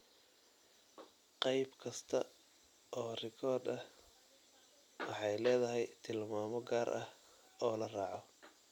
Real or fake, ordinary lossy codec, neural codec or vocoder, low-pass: real; none; none; 19.8 kHz